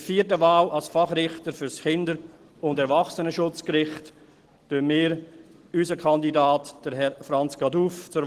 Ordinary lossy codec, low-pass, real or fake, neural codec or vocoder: Opus, 16 kbps; 14.4 kHz; real; none